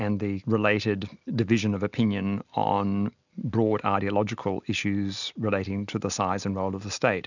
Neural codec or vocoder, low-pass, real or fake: none; 7.2 kHz; real